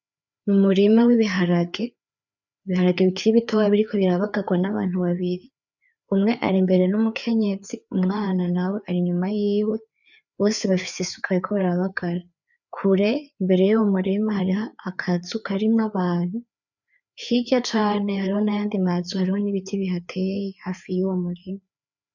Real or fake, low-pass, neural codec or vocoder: fake; 7.2 kHz; codec, 16 kHz, 4 kbps, FreqCodec, larger model